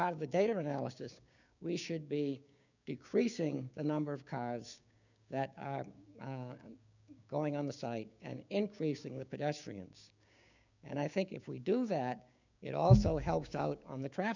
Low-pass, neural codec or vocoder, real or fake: 7.2 kHz; codec, 16 kHz, 6 kbps, DAC; fake